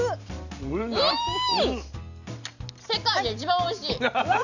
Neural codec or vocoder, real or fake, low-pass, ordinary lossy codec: none; real; 7.2 kHz; none